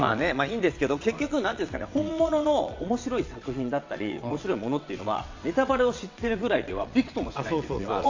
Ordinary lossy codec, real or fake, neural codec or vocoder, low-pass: AAC, 48 kbps; fake; vocoder, 22.05 kHz, 80 mel bands, WaveNeXt; 7.2 kHz